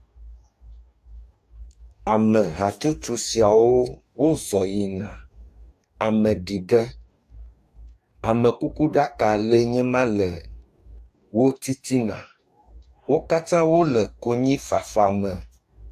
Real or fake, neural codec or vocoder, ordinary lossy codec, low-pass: fake; codec, 44.1 kHz, 2.6 kbps, DAC; AAC, 96 kbps; 14.4 kHz